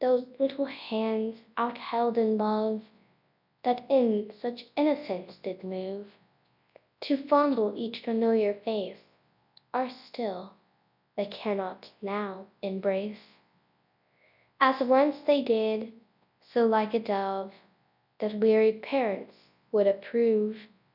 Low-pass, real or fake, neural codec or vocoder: 5.4 kHz; fake; codec, 24 kHz, 0.9 kbps, WavTokenizer, large speech release